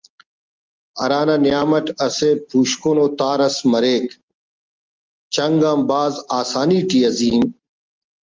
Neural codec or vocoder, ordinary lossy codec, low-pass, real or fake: none; Opus, 32 kbps; 7.2 kHz; real